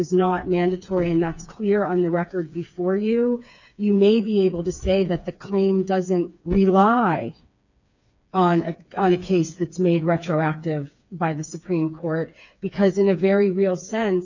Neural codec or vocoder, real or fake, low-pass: codec, 16 kHz, 4 kbps, FreqCodec, smaller model; fake; 7.2 kHz